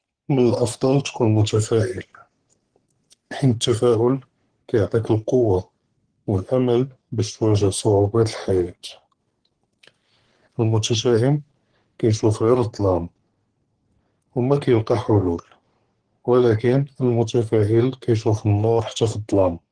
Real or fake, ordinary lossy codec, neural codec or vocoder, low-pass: fake; Opus, 16 kbps; codec, 44.1 kHz, 3.4 kbps, Pupu-Codec; 9.9 kHz